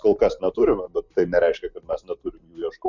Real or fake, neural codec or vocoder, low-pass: real; none; 7.2 kHz